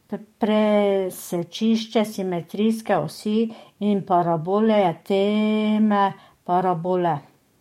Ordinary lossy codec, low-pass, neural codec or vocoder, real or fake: MP3, 64 kbps; 19.8 kHz; codec, 44.1 kHz, 7.8 kbps, DAC; fake